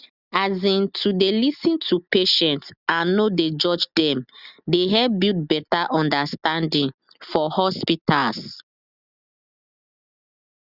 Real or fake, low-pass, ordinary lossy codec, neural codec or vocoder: real; 5.4 kHz; Opus, 64 kbps; none